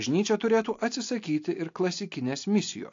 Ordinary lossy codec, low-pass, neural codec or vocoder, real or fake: AAC, 48 kbps; 7.2 kHz; none; real